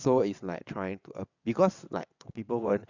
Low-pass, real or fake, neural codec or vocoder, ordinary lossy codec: 7.2 kHz; fake; vocoder, 22.05 kHz, 80 mel bands, WaveNeXt; none